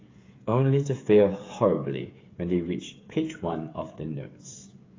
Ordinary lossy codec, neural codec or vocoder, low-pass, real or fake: AAC, 32 kbps; codec, 16 kHz, 8 kbps, FreqCodec, smaller model; 7.2 kHz; fake